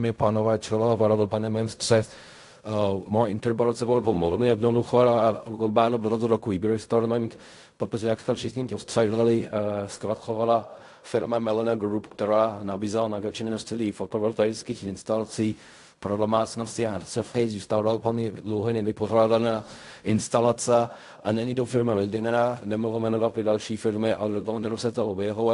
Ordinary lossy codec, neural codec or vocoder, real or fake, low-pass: AAC, 64 kbps; codec, 16 kHz in and 24 kHz out, 0.4 kbps, LongCat-Audio-Codec, fine tuned four codebook decoder; fake; 10.8 kHz